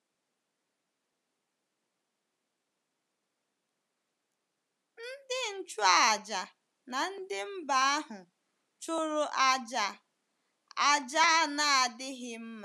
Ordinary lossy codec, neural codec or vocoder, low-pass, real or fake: none; none; none; real